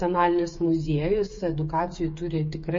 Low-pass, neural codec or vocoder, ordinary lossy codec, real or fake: 7.2 kHz; codec, 16 kHz, 8 kbps, FreqCodec, smaller model; MP3, 32 kbps; fake